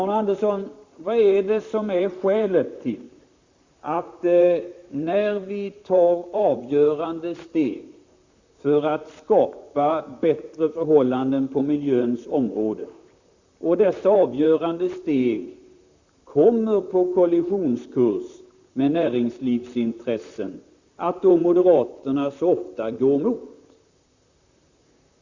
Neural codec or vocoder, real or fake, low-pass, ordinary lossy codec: vocoder, 44.1 kHz, 128 mel bands, Pupu-Vocoder; fake; 7.2 kHz; Opus, 64 kbps